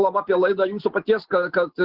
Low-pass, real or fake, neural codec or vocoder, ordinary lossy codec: 5.4 kHz; real; none; Opus, 16 kbps